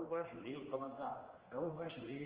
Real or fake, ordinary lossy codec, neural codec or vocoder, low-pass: fake; Opus, 16 kbps; codec, 16 kHz, 2 kbps, X-Codec, HuBERT features, trained on general audio; 3.6 kHz